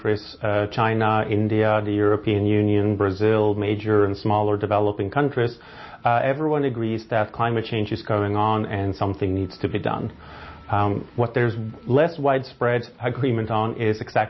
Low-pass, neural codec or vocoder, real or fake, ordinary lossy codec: 7.2 kHz; none; real; MP3, 24 kbps